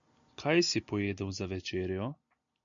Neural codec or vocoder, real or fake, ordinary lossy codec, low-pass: none; real; MP3, 96 kbps; 7.2 kHz